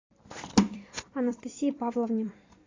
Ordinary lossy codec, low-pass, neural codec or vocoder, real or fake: AAC, 32 kbps; 7.2 kHz; vocoder, 44.1 kHz, 128 mel bands every 256 samples, BigVGAN v2; fake